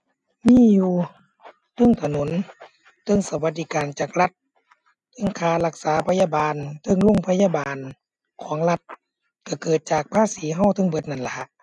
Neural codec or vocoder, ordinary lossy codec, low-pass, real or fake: none; none; 10.8 kHz; real